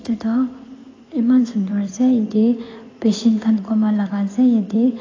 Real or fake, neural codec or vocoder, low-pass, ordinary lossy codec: fake; codec, 16 kHz in and 24 kHz out, 2.2 kbps, FireRedTTS-2 codec; 7.2 kHz; AAC, 32 kbps